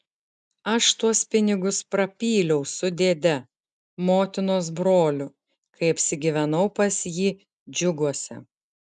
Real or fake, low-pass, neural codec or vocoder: real; 9.9 kHz; none